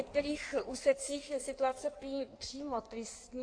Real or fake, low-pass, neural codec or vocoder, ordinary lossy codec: fake; 9.9 kHz; codec, 16 kHz in and 24 kHz out, 1.1 kbps, FireRedTTS-2 codec; AAC, 48 kbps